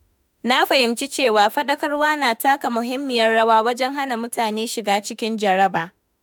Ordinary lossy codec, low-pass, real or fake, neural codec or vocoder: none; none; fake; autoencoder, 48 kHz, 32 numbers a frame, DAC-VAE, trained on Japanese speech